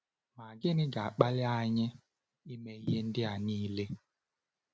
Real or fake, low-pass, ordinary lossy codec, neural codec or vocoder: real; none; none; none